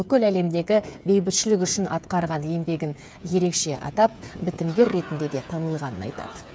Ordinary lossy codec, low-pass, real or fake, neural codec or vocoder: none; none; fake; codec, 16 kHz, 8 kbps, FreqCodec, smaller model